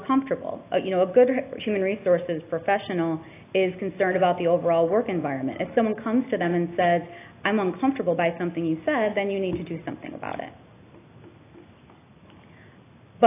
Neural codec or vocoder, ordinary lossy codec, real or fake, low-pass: none; AAC, 24 kbps; real; 3.6 kHz